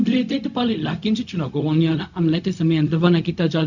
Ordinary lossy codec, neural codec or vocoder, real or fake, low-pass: none; codec, 16 kHz, 0.4 kbps, LongCat-Audio-Codec; fake; 7.2 kHz